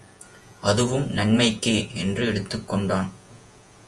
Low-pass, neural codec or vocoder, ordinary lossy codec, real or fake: 10.8 kHz; vocoder, 48 kHz, 128 mel bands, Vocos; Opus, 32 kbps; fake